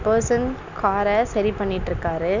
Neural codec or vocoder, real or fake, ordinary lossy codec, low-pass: none; real; none; 7.2 kHz